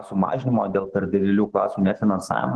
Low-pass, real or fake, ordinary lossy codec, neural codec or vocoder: 10.8 kHz; fake; Opus, 16 kbps; autoencoder, 48 kHz, 128 numbers a frame, DAC-VAE, trained on Japanese speech